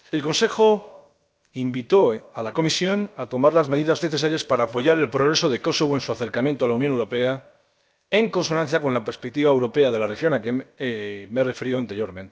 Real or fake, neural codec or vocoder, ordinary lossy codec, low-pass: fake; codec, 16 kHz, about 1 kbps, DyCAST, with the encoder's durations; none; none